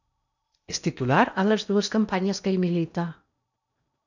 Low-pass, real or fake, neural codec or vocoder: 7.2 kHz; fake; codec, 16 kHz in and 24 kHz out, 0.8 kbps, FocalCodec, streaming, 65536 codes